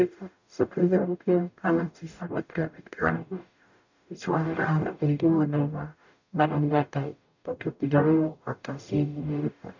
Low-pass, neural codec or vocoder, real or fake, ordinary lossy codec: 7.2 kHz; codec, 44.1 kHz, 0.9 kbps, DAC; fake; none